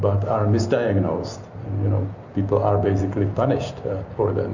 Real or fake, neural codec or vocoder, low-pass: real; none; 7.2 kHz